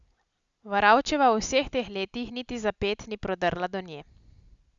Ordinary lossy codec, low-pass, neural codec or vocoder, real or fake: Opus, 64 kbps; 7.2 kHz; none; real